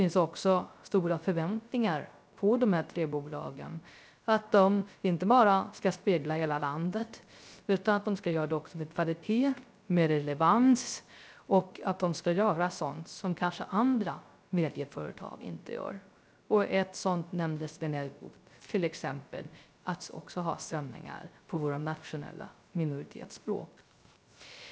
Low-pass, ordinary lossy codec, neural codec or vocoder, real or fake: none; none; codec, 16 kHz, 0.3 kbps, FocalCodec; fake